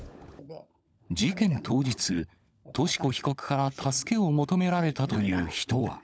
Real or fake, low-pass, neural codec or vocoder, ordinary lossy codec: fake; none; codec, 16 kHz, 16 kbps, FunCodec, trained on LibriTTS, 50 frames a second; none